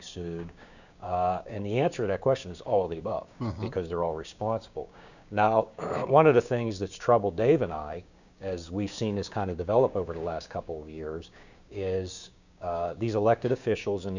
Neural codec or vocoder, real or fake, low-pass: codec, 16 kHz, 6 kbps, DAC; fake; 7.2 kHz